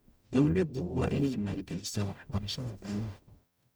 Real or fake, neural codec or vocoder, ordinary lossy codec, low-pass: fake; codec, 44.1 kHz, 0.9 kbps, DAC; none; none